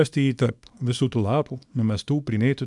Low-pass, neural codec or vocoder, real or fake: 10.8 kHz; codec, 24 kHz, 0.9 kbps, WavTokenizer, small release; fake